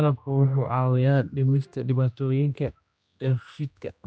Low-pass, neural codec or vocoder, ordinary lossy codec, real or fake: none; codec, 16 kHz, 1 kbps, X-Codec, HuBERT features, trained on general audio; none; fake